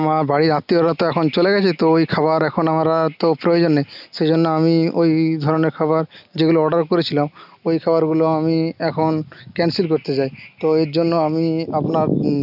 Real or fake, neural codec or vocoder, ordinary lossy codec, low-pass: real; none; none; 5.4 kHz